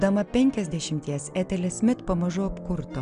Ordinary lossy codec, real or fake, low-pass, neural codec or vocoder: MP3, 96 kbps; real; 9.9 kHz; none